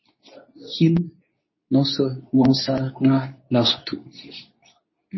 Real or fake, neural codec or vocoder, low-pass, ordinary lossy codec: fake; codec, 24 kHz, 0.9 kbps, WavTokenizer, medium speech release version 2; 7.2 kHz; MP3, 24 kbps